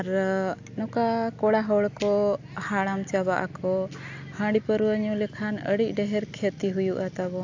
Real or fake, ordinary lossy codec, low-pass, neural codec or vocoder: real; AAC, 48 kbps; 7.2 kHz; none